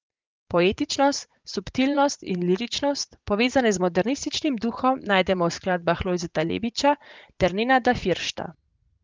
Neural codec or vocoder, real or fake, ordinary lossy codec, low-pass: vocoder, 44.1 kHz, 80 mel bands, Vocos; fake; Opus, 32 kbps; 7.2 kHz